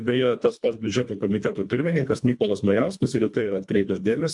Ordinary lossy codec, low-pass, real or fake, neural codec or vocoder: MP3, 64 kbps; 10.8 kHz; fake; codec, 24 kHz, 1.5 kbps, HILCodec